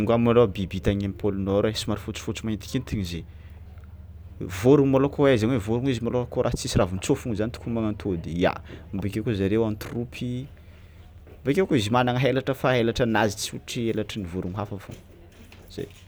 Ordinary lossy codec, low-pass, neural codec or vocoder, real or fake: none; none; none; real